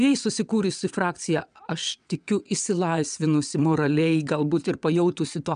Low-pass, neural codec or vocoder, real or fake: 9.9 kHz; vocoder, 22.05 kHz, 80 mel bands, WaveNeXt; fake